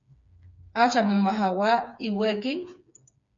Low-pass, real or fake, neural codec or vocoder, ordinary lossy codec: 7.2 kHz; fake; codec, 16 kHz, 4 kbps, FreqCodec, smaller model; MP3, 64 kbps